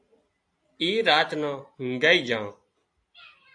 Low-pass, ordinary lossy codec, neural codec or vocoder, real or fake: 9.9 kHz; AAC, 64 kbps; none; real